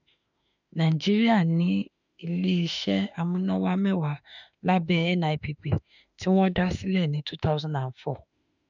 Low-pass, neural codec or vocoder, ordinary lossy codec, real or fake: 7.2 kHz; autoencoder, 48 kHz, 32 numbers a frame, DAC-VAE, trained on Japanese speech; none; fake